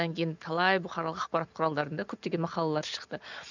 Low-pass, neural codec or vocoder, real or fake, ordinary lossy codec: 7.2 kHz; none; real; none